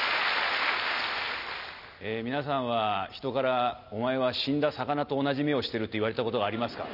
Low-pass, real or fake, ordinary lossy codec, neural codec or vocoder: 5.4 kHz; real; none; none